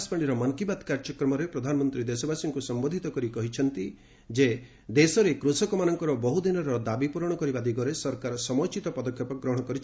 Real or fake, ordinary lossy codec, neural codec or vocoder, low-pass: real; none; none; none